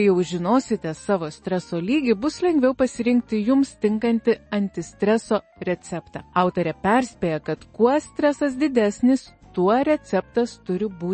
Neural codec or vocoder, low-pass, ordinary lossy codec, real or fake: none; 10.8 kHz; MP3, 32 kbps; real